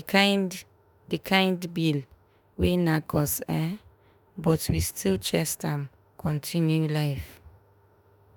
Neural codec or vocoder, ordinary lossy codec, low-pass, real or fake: autoencoder, 48 kHz, 32 numbers a frame, DAC-VAE, trained on Japanese speech; none; none; fake